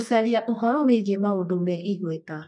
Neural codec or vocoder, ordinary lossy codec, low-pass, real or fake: codec, 24 kHz, 0.9 kbps, WavTokenizer, medium music audio release; none; 10.8 kHz; fake